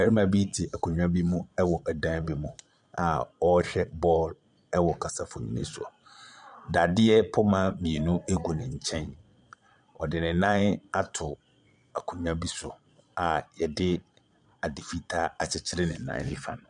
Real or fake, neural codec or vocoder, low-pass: fake; vocoder, 22.05 kHz, 80 mel bands, Vocos; 9.9 kHz